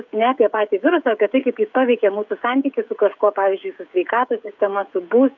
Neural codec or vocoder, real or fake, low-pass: codec, 16 kHz, 16 kbps, FreqCodec, smaller model; fake; 7.2 kHz